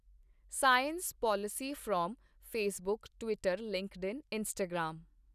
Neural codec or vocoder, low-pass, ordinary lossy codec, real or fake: none; 14.4 kHz; none; real